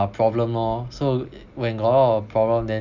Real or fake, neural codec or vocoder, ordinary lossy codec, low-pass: real; none; none; 7.2 kHz